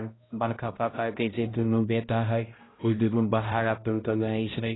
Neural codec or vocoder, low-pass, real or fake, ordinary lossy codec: codec, 16 kHz, 0.5 kbps, X-Codec, HuBERT features, trained on balanced general audio; 7.2 kHz; fake; AAC, 16 kbps